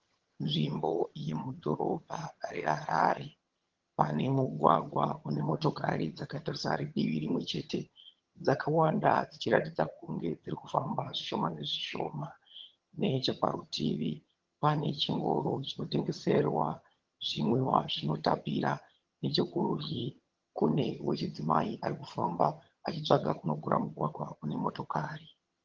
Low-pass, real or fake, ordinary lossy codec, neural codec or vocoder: 7.2 kHz; fake; Opus, 16 kbps; vocoder, 22.05 kHz, 80 mel bands, HiFi-GAN